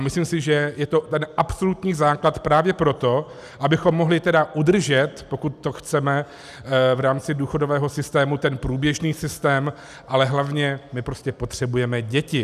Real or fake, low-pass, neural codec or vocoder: real; 14.4 kHz; none